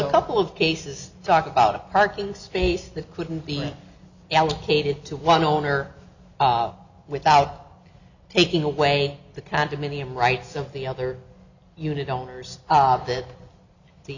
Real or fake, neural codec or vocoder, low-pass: real; none; 7.2 kHz